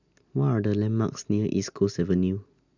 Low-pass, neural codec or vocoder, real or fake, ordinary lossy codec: 7.2 kHz; none; real; none